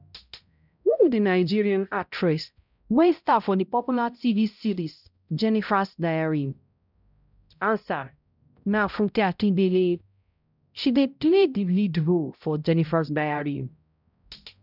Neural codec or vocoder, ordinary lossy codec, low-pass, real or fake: codec, 16 kHz, 0.5 kbps, X-Codec, HuBERT features, trained on balanced general audio; none; 5.4 kHz; fake